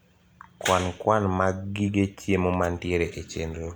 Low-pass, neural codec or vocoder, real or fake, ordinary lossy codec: none; none; real; none